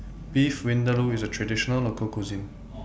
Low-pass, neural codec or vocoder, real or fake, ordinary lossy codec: none; none; real; none